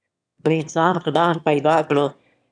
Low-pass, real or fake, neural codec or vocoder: 9.9 kHz; fake; autoencoder, 22.05 kHz, a latent of 192 numbers a frame, VITS, trained on one speaker